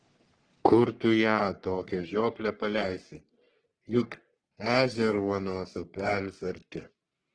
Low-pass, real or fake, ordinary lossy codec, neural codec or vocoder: 9.9 kHz; fake; Opus, 16 kbps; codec, 44.1 kHz, 3.4 kbps, Pupu-Codec